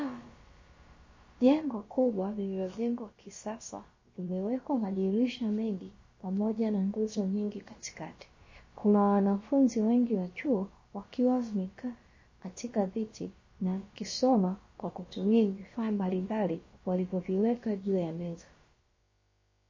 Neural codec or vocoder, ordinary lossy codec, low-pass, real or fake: codec, 16 kHz, about 1 kbps, DyCAST, with the encoder's durations; MP3, 32 kbps; 7.2 kHz; fake